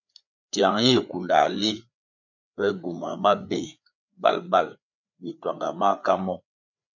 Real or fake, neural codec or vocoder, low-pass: fake; codec, 16 kHz, 4 kbps, FreqCodec, larger model; 7.2 kHz